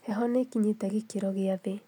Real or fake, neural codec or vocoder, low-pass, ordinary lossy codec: real; none; 19.8 kHz; none